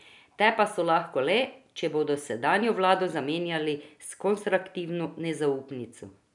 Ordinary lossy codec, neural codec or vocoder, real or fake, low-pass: none; none; real; 10.8 kHz